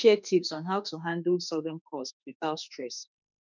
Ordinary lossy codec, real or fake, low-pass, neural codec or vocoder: none; fake; 7.2 kHz; autoencoder, 48 kHz, 32 numbers a frame, DAC-VAE, trained on Japanese speech